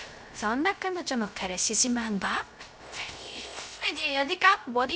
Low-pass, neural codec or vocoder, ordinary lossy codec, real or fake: none; codec, 16 kHz, 0.3 kbps, FocalCodec; none; fake